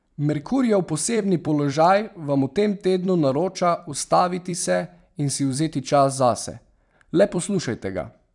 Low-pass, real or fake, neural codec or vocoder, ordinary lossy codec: 10.8 kHz; fake; vocoder, 44.1 kHz, 128 mel bands every 256 samples, BigVGAN v2; none